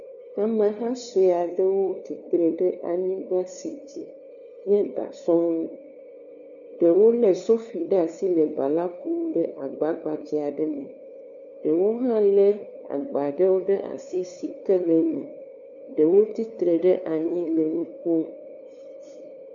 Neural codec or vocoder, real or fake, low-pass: codec, 16 kHz, 2 kbps, FunCodec, trained on LibriTTS, 25 frames a second; fake; 7.2 kHz